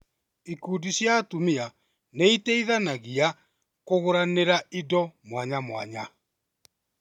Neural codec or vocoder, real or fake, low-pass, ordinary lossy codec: none; real; 19.8 kHz; none